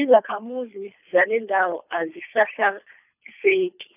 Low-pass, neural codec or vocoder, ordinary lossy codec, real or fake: 3.6 kHz; codec, 24 kHz, 3 kbps, HILCodec; none; fake